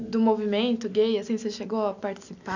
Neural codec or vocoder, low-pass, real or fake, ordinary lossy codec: none; 7.2 kHz; real; none